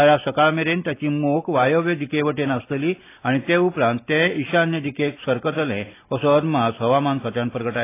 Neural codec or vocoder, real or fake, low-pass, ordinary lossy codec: none; real; 3.6 kHz; AAC, 24 kbps